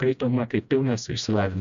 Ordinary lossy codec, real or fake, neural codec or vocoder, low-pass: AAC, 96 kbps; fake; codec, 16 kHz, 1 kbps, FreqCodec, smaller model; 7.2 kHz